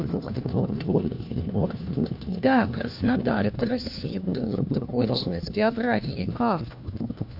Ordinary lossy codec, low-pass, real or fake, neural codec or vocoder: none; 5.4 kHz; fake; codec, 16 kHz, 1 kbps, FunCodec, trained on LibriTTS, 50 frames a second